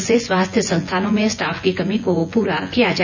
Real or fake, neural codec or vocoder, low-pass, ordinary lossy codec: fake; vocoder, 24 kHz, 100 mel bands, Vocos; 7.2 kHz; none